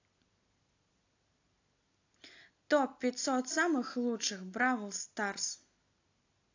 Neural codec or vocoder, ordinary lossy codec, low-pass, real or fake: none; AAC, 48 kbps; 7.2 kHz; real